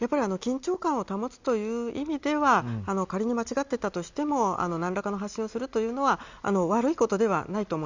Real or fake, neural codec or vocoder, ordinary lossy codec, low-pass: real; none; Opus, 64 kbps; 7.2 kHz